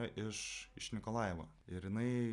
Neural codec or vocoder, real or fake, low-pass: none; real; 10.8 kHz